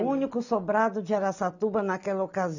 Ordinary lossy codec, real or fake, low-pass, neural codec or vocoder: none; real; 7.2 kHz; none